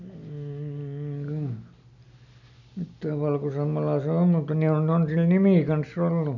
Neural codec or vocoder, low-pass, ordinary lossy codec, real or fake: none; 7.2 kHz; none; real